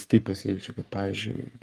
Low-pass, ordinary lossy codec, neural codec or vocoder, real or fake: 14.4 kHz; Opus, 32 kbps; codec, 44.1 kHz, 3.4 kbps, Pupu-Codec; fake